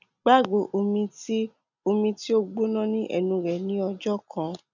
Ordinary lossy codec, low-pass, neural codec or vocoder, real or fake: none; 7.2 kHz; none; real